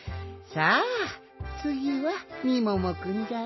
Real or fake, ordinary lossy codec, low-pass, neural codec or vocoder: real; MP3, 24 kbps; 7.2 kHz; none